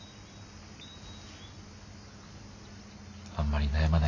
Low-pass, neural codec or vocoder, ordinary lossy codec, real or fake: 7.2 kHz; none; AAC, 48 kbps; real